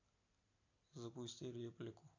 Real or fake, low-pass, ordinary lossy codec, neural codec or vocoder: real; 7.2 kHz; AAC, 48 kbps; none